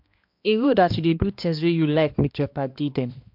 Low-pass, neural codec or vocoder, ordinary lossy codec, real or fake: 5.4 kHz; codec, 16 kHz, 1 kbps, X-Codec, HuBERT features, trained on balanced general audio; MP3, 48 kbps; fake